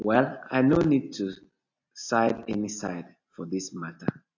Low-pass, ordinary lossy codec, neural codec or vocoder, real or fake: 7.2 kHz; AAC, 48 kbps; none; real